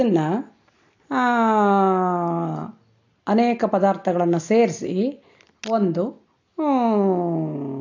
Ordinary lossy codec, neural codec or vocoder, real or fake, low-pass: AAC, 48 kbps; none; real; 7.2 kHz